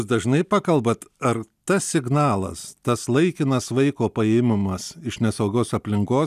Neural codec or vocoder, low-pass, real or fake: none; 14.4 kHz; real